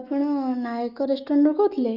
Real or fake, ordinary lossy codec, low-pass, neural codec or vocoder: fake; none; 5.4 kHz; autoencoder, 48 kHz, 128 numbers a frame, DAC-VAE, trained on Japanese speech